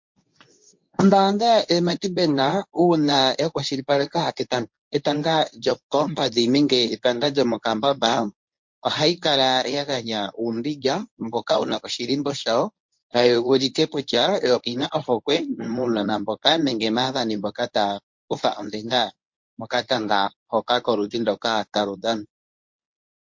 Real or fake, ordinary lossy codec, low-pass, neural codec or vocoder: fake; MP3, 48 kbps; 7.2 kHz; codec, 24 kHz, 0.9 kbps, WavTokenizer, medium speech release version 1